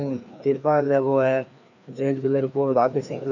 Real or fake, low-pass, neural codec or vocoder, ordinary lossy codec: fake; 7.2 kHz; codec, 16 kHz, 2 kbps, FreqCodec, larger model; none